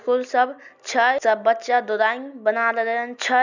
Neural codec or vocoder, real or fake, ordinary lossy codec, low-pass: none; real; none; 7.2 kHz